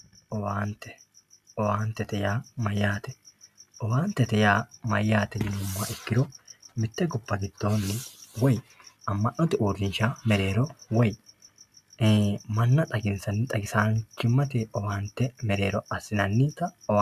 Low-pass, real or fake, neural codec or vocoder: 14.4 kHz; real; none